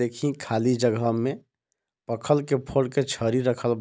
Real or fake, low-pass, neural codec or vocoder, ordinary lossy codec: real; none; none; none